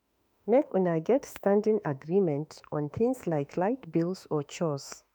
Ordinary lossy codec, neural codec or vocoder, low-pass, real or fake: none; autoencoder, 48 kHz, 32 numbers a frame, DAC-VAE, trained on Japanese speech; none; fake